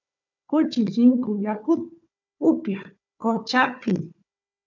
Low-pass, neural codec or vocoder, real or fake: 7.2 kHz; codec, 16 kHz, 4 kbps, FunCodec, trained on Chinese and English, 50 frames a second; fake